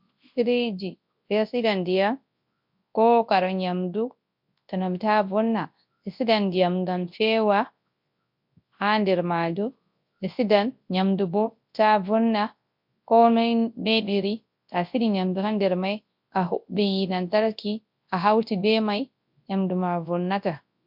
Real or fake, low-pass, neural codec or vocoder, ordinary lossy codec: fake; 5.4 kHz; codec, 24 kHz, 0.9 kbps, WavTokenizer, large speech release; MP3, 48 kbps